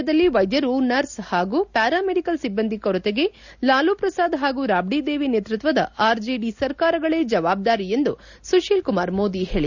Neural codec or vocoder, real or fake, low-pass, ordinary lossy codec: none; real; 7.2 kHz; none